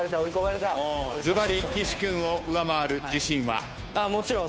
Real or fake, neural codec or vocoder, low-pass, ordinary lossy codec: fake; codec, 16 kHz, 2 kbps, FunCodec, trained on Chinese and English, 25 frames a second; none; none